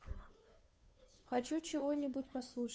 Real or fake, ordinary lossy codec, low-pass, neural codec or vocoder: fake; none; none; codec, 16 kHz, 2 kbps, FunCodec, trained on Chinese and English, 25 frames a second